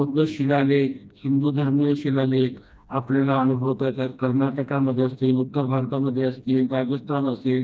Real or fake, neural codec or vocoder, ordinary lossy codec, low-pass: fake; codec, 16 kHz, 1 kbps, FreqCodec, smaller model; none; none